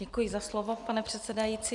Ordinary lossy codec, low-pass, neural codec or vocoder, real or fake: MP3, 96 kbps; 10.8 kHz; none; real